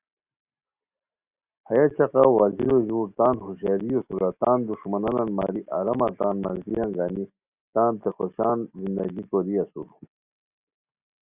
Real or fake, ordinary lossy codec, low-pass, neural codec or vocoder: real; Opus, 64 kbps; 3.6 kHz; none